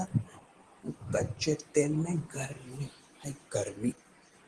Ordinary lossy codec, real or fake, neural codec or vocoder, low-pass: Opus, 16 kbps; real; none; 10.8 kHz